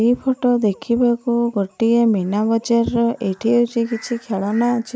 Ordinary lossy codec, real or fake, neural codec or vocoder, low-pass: none; real; none; none